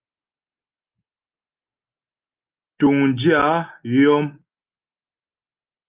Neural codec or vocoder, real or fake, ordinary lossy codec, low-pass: none; real; Opus, 32 kbps; 3.6 kHz